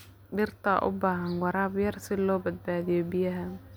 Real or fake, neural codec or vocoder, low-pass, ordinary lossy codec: real; none; none; none